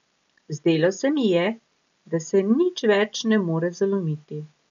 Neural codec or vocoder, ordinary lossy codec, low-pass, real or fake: none; none; 7.2 kHz; real